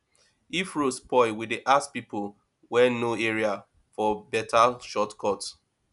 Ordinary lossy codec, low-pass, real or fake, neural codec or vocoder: none; 10.8 kHz; real; none